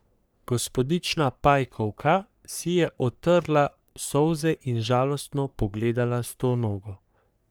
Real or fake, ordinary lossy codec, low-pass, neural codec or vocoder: fake; none; none; codec, 44.1 kHz, 3.4 kbps, Pupu-Codec